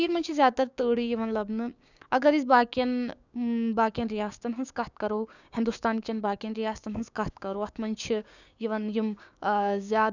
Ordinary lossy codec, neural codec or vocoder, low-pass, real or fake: none; codec, 16 kHz, 6 kbps, DAC; 7.2 kHz; fake